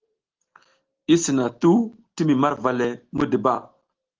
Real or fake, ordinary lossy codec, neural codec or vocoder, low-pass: real; Opus, 16 kbps; none; 7.2 kHz